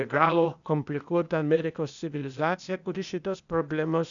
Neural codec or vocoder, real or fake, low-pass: codec, 16 kHz, 0.8 kbps, ZipCodec; fake; 7.2 kHz